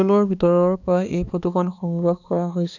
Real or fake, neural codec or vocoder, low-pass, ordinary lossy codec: fake; codec, 16 kHz, 2 kbps, X-Codec, HuBERT features, trained on balanced general audio; 7.2 kHz; none